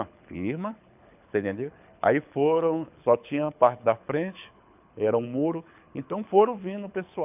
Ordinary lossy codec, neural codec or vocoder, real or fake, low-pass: none; codec, 24 kHz, 6 kbps, HILCodec; fake; 3.6 kHz